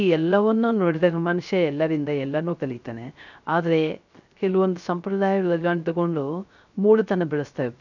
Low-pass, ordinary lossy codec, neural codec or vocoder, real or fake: 7.2 kHz; none; codec, 16 kHz, 0.3 kbps, FocalCodec; fake